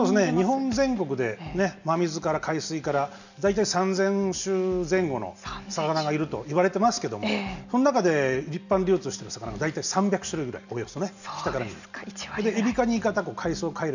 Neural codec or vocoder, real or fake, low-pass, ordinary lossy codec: none; real; 7.2 kHz; none